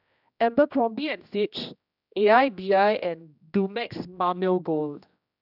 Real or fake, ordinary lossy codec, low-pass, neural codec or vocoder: fake; none; 5.4 kHz; codec, 16 kHz, 1 kbps, X-Codec, HuBERT features, trained on general audio